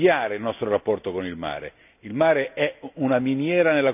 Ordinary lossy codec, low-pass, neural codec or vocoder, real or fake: none; 3.6 kHz; none; real